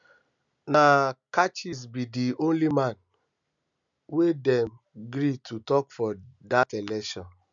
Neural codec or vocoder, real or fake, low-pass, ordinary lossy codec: none; real; 7.2 kHz; MP3, 96 kbps